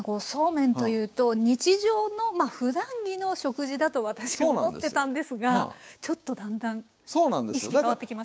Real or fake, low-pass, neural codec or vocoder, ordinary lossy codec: fake; none; codec, 16 kHz, 6 kbps, DAC; none